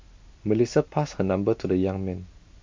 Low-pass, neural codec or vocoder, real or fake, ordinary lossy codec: 7.2 kHz; none; real; MP3, 48 kbps